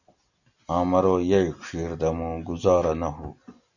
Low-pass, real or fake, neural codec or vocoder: 7.2 kHz; real; none